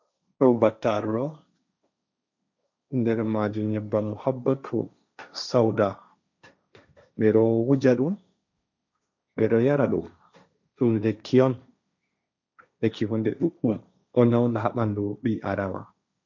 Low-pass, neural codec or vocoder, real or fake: 7.2 kHz; codec, 16 kHz, 1.1 kbps, Voila-Tokenizer; fake